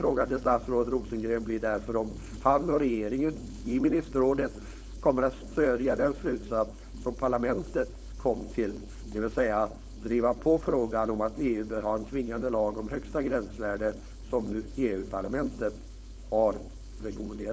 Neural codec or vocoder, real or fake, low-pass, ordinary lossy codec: codec, 16 kHz, 4.8 kbps, FACodec; fake; none; none